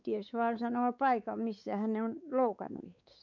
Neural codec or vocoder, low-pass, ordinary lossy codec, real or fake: codec, 16 kHz, 4 kbps, X-Codec, WavLM features, trained on Multilingual LibriSpeech; 7.2 kHz; none; fake